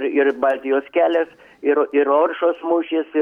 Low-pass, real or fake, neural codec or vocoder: 19.8 kHz; real; none